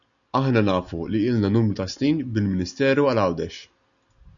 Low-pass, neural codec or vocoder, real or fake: 7.2 kHz; none; real